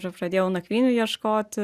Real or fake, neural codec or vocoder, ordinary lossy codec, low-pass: real; none; Opus, 64 kbps; 14.4 kHz